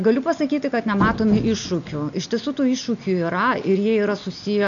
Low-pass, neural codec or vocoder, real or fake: 7.2 kHz; none; real